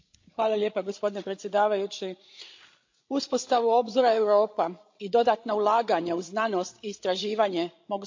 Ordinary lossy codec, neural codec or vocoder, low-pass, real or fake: MP3, 48 kbps; codec, 16 kHz, 16 kbps, FreqCodec, larger model; 7.2 kHz; fake